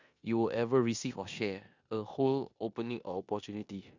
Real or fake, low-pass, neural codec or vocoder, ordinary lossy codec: fake; 7.2 kHz; codec, 16 kHz in and 24 kHz out, 0.9 kbps, LongCat-Audio-Codec, four codebook decoder; Opus, 64 kbps